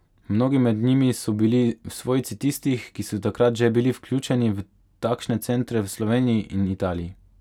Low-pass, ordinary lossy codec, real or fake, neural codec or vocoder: 19.8 kHz; none; real; none